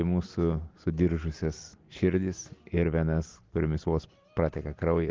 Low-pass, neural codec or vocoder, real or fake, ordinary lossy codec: 7.2 kHz; none; real; Opus, 16 kbps